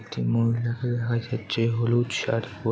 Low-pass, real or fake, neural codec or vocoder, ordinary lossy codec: none; real; none; none